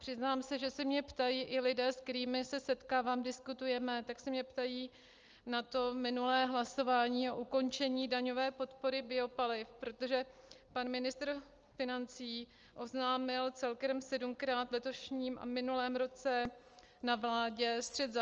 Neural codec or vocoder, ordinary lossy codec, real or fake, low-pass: none; Opus, 24 kbps; real; 7.2 kHz